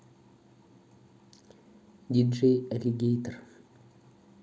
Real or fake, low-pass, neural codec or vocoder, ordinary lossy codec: real; none; none; none